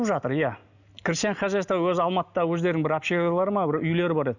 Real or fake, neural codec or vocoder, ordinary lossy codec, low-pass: real; none; none; 7.2 kHz